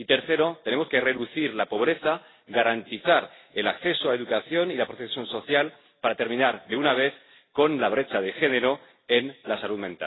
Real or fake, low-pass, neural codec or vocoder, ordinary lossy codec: real; 7.2 kHz; none; AAC, 16 kbps